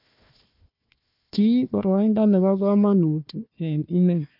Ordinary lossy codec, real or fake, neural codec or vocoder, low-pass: none; fake; codec, 16 kHz, 1 kbps, FunCodec, trained on Chinese and English, 50 frames a second; 5.4 kHz